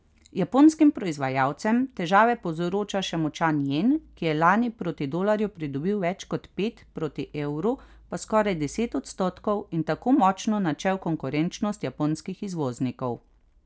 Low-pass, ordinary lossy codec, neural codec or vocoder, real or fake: none; none; none; real